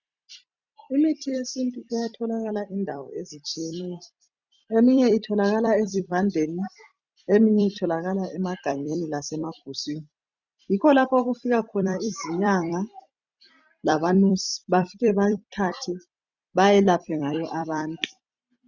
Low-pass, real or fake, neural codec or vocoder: 7.2 kHz; fake; vocoder, 44.1 kHz, 128 mel bands every 256 samples, BigVGAN v2